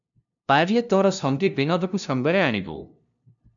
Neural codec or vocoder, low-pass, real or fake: codec, 16 kHz, 0.5 kbps, FunCodec, trained on LibriTTS, 25 frames a second; 7.2 kHz; fake